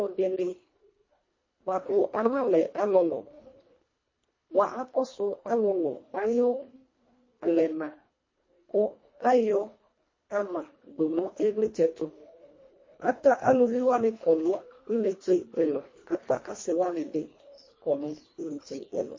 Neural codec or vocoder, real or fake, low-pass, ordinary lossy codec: codec, 24 kHz, 1.5 kbps, HILCodec; fake; 7.2 kHz; MP3, 32 kbps